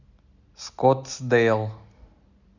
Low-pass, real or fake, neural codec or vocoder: 7.2 kHz; real; none